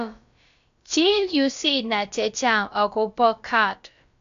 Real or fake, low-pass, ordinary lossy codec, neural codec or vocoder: fake; 7.2 kHz; AAC, 64 kbps; codec, 16 kHz, about 1 kbps, DyCAST, with the encoder's durations